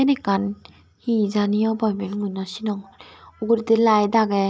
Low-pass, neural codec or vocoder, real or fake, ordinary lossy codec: none; none; real; none